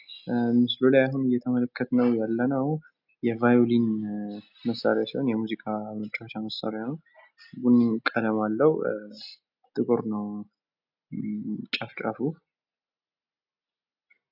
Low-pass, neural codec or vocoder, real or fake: 5.4 kHz; none; real